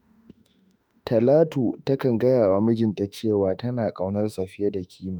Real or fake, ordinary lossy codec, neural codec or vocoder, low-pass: fake; none; autoencoder, 48 kHz, 32 numbers a frame, DAC-VAE, trained on Japanese speech; none